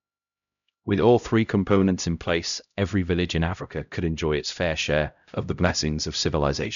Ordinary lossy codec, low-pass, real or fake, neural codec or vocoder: none; 7.2 kHz; fake; codec, 16 kHz, 0.5 kbps, X-Codec, HuBERT features, trained on LibriSpeech